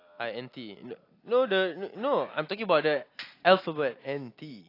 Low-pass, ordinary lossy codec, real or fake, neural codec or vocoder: 5.4 kHz; AAC, 32 kbps; real; none